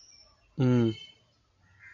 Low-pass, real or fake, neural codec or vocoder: 7.2 kHz; real; none